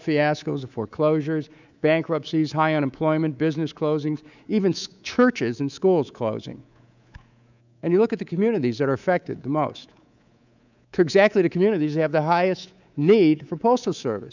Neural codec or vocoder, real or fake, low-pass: codec, 24 kHz, 3.1 kbps, DualCodec; fake; 7.2 kHz